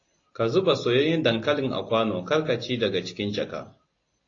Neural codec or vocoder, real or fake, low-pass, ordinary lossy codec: none; real; 7.2 kHz; AAC, 32 kbps